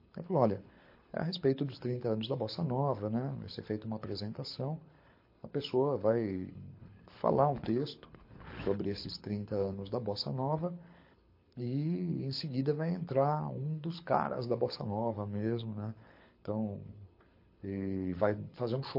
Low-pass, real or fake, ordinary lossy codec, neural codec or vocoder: 5.4 kHz; fake; MP3, 32 kbps; codec, 24 kHz, 6 kbps, HILCodec